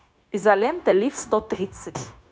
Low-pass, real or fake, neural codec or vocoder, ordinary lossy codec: none; fake; codec, 16 kHz, 0.9 kbps, LongCat-Audio-Codec; none